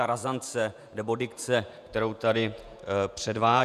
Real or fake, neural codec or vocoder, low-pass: fake; vocoder, 48 kHz, 128 mel bands, Vocos; 14.4 kHz